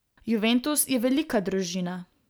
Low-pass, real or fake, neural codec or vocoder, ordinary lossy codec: none; real; none; none